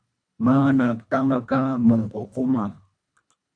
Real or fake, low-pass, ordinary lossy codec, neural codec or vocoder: fake; 9.9 kHz; MP3, 64 kbps; codec, 24 kHz, 1.5 kbps, HILCodec